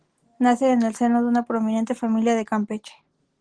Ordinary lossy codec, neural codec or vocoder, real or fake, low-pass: Opus, 16 kbps; none; real; 9.9 kHz